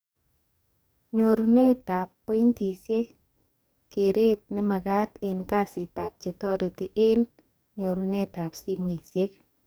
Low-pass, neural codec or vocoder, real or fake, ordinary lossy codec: none; codec, 44.1 kHz, 2.6 kbps, DAC; fake; none